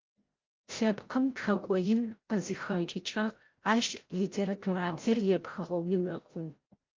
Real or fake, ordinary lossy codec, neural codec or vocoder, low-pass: fake; Opus, 32 kbps; codec, 16 kHz, 0.5 kbps, FreqCodec, larger model; 7.2 kHz